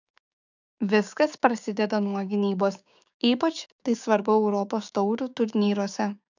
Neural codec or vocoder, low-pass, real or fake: codec, 16 kHz, 6 kbps, DAC; 7.2 kHz; fake